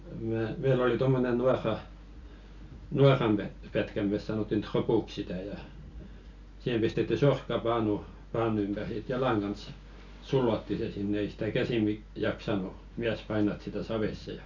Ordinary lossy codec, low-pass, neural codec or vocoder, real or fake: none; 7.2 kHz; none; real